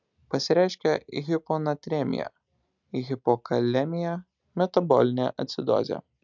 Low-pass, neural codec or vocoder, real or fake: 7.2 kHz; none; real